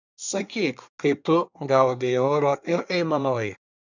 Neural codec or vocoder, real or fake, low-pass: codec, 24 kHz, 1 kbps, SNAC; fake; 7.2 kHz